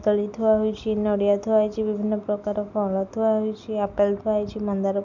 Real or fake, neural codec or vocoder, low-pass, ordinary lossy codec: real; none; 7.2 kHz; none